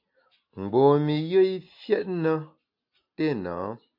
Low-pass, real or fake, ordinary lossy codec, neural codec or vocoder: 5.4 kHz; real; MP3, 48 kbps; none